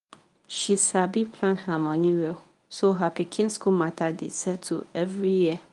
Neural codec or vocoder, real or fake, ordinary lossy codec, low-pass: codec, 24 kHz, 0.9 kbps, WavTokenizer, medium speech release version 1; fake; Opus, 32 kbps; 10.8 kHz